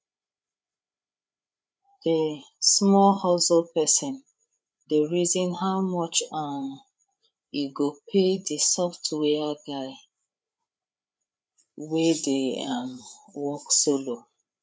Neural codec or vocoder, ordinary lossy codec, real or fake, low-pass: codec, 16 kHz, 8 kbps, FreqCodec, larger model; none; fake; none